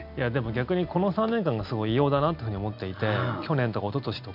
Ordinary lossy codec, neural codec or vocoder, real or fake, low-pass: none; none; real; 5.4 kHz